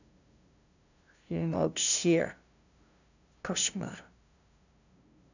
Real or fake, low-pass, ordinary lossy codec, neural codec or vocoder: fake; 7.2 kHz; none; codec, 16 kHz, 0.5 kbps, FunCodec, trained on LibriTTS, 25 frames a second